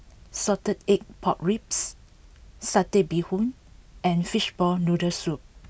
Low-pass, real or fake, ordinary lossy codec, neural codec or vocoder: none; real; none; none